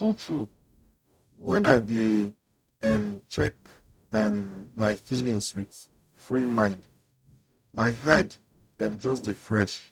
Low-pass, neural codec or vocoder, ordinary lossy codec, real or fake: 19.8 kHz; codec, 44.1 kHz, 0.9 kbps, DAC; none; fake